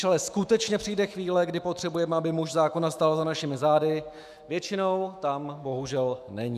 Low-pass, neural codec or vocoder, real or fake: 14.4 kHz; autoencoder, 48 kHz, 128 numbers a frame, DAC-VAE, trained on Japanese speech; fake